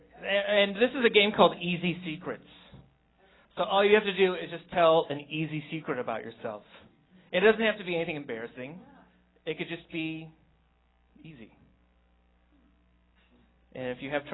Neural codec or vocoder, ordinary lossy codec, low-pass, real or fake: none; AAC, 16 kbps; 7.2 kHz; real